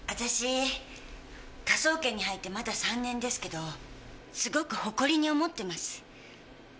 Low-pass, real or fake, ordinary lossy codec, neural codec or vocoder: none; real; none; none